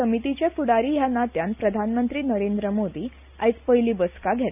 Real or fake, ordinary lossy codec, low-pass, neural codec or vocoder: real; none; 3.6 kHz; none